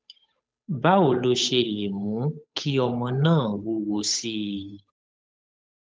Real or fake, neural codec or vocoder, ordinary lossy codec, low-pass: fake; codec, 16 kHz, 8 kbps, FunCodec, trained on Chinese and English, 25 frames a second; none; none